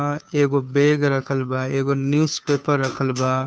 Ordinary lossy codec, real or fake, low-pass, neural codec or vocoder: none; fake; none; codec, 16 kHz, 2 kbps, FunCodec, trained on Chinese and English, 25 frames a second